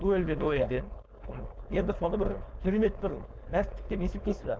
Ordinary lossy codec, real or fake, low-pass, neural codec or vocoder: none; fake; none; codec, 16 kHz, 4.8 kbps, FACodec